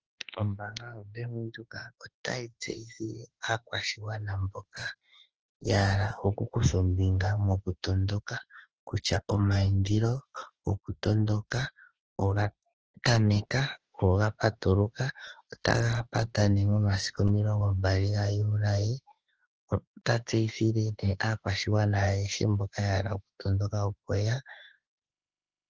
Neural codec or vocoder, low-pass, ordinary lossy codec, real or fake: autoencoder, 48 kHz, 32 numbers a frame, DAC-VAE, trained on Japanese speech; 7.2 kHz; Opus, 16 kbps; fake